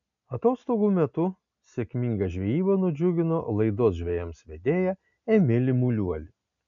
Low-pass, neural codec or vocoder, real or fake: 7.2 kHz; none; real